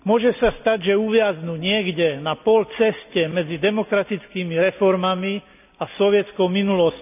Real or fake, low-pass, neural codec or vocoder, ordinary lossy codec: real; 3.6 kHz; none; none